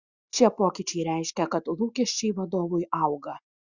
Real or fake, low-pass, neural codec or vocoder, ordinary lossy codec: real; 7.2 kHz; none; Opus, 64 kbps